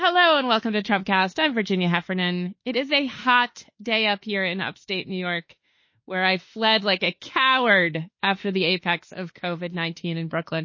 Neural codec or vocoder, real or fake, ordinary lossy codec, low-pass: autoencoder, 48 kHz, 32 numbers a frame, DAC-VAE, trained on Japanese speech; fake; MP3, 32 kbps; 7.2 kHz